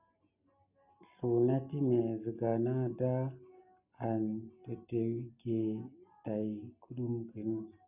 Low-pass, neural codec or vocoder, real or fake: 3.6 kHz; none; real